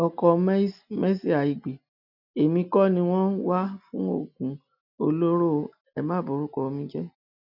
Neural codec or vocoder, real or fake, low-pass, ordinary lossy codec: none; real; 5.4 kHz; none